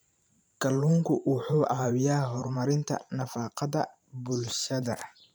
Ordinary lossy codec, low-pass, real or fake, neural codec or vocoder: none; none; fake; vocoder, 44.1 kHz, 128 mel bands every 512 samples, BigVGAN v2